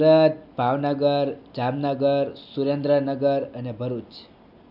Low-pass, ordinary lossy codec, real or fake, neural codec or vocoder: 5.4 kHz; none; real; none